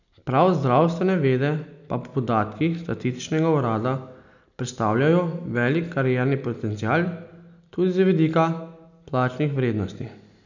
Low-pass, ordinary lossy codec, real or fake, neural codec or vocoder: 7.2 kHz; none; real; none